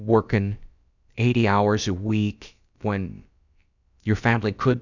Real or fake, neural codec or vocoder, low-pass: fake; codec, 16 kHz, about 1 kbps, DyCAST, with the encoder's durations; 7.2 kHz